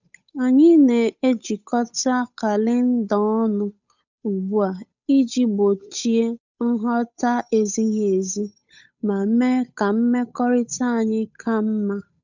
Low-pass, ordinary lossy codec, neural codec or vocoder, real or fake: 7.2 kHz; none; codec, 16 kHz, 8 kbps, FunCodec, trained on Chinese and English, 25 frames a second; fake